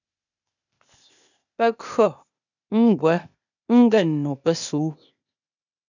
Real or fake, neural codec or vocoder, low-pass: fake; codec, 16 kHz, 0.8 kbps, ZipCodec; 7.2 kHz